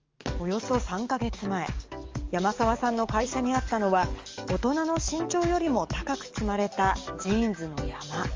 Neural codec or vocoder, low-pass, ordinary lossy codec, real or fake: codec, 44.1 kHz, 7.8 kbps, DAC; 7.2 kHz; Opus, 32 kbps; fake